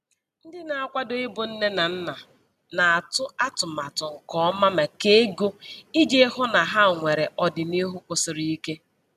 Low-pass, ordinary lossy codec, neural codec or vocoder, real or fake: 14.4 kHz; none; none; real